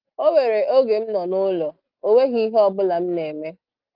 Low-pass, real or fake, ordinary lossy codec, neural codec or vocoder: 5.4 kHz; real; Opus, 32 kbps; none